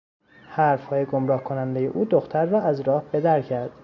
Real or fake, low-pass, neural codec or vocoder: real; 7.2 kHz; none